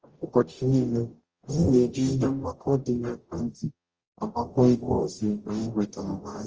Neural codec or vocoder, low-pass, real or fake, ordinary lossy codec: codec, 44.1 kHz, 0.9 kbps, DAC; 7.2 kHz; fake; Opus, 24 kbps